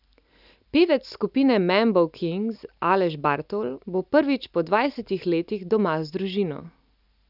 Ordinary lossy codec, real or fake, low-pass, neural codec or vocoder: none; real; 5.4 kHz; none